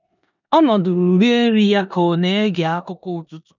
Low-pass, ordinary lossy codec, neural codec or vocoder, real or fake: 7.2 kHz; none; codec, 16 kHz in and 24 kHz out, 0.9 kbps, LongCat-Audio-Codec, four codebook decoder; fake